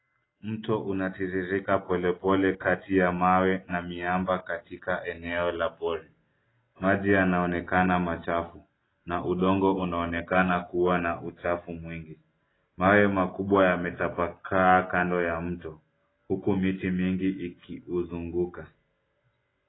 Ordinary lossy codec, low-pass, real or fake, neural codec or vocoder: AAC, 16 kbps; 7.2 kHz; real; none